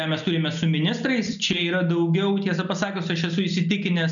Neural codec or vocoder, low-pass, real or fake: none; 7.2 kHz; real